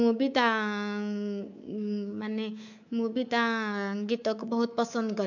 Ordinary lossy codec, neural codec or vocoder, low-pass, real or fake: none; codec, 24 kHz, 3.1 kbps, DualCodec; 7.2 kHz; fake